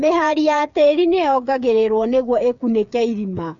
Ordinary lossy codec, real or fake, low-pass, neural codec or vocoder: none; fake; 7.2 kHz; codec, 16 kHz, 8 kbps, FreqCodec, smaller model